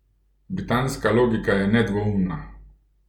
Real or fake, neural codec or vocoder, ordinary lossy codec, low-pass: real; none; MP3, 96 kbps; 19.8 kHz